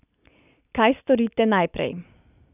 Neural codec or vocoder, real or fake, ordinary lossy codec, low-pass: none; real; none; 3.6 kHz